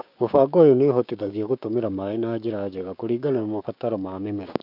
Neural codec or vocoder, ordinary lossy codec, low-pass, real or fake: codec, 16 kHz, 6 kbps, DAC; none; 5.4 kHz; fake